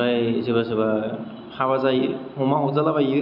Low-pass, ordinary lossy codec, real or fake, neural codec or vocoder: 5.4 kHz; none; real; none